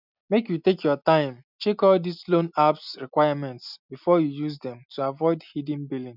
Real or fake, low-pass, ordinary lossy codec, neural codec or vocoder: real; 5.4 kHz; none; none